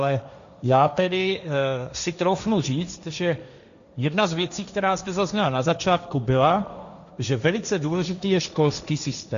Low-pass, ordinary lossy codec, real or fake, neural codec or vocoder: 7.2 kHz; MP3, 96 kbps; fake; codec, 16 kHz, 1.1 kbps, Voila-Tokenizer